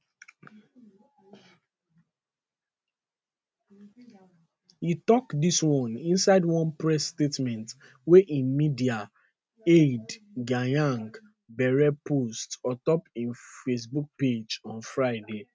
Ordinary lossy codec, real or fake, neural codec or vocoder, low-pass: none; real; none; none